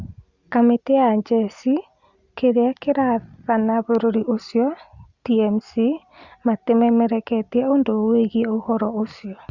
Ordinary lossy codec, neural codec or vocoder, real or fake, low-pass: Opus, 64 kbps; none; real; 7.2 kHz